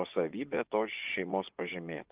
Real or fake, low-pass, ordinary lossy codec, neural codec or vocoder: fake; 3.6 kHz; Opus, 32 kbps; vocoder, 24 kHz, 100 mel bands, Vocos